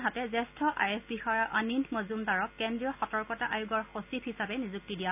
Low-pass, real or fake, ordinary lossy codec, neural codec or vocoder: 3.6 kHz; real; none; none